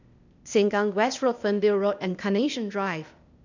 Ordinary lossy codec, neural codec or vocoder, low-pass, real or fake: none; codec, 16 kHz, 0.8 kbps, ZipCodec; 7.2 kHz; fake